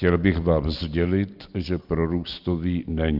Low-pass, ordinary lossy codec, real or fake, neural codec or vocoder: 5.4 kHz; Opus, 32 kbps; real; none